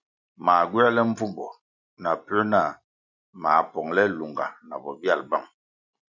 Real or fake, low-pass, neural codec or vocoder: real; 7.2 kHz; none